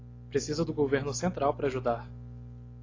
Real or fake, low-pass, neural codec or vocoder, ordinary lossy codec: real; 7.2 kHz; none; AAC, 32 kbps